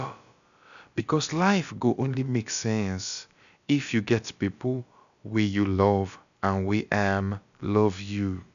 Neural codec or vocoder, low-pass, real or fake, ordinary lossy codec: codec, 16 kHz, about 1 kbps, DyCAST, with the encoder's durations; 7.2 kHz; fake; none